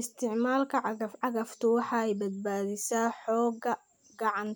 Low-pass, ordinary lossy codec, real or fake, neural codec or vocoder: none; none; real; none